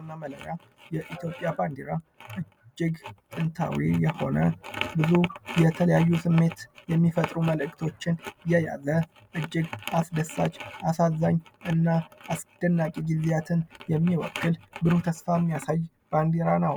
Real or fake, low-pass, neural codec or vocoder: real; 19.8 kHz; none